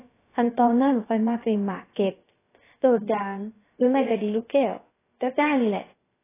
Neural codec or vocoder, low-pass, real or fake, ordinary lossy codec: codec, 16 kHz, about 1 kbps, DyCAST, with the encoder's durations; 3.6 kHz; fake; AAC, 16 kbps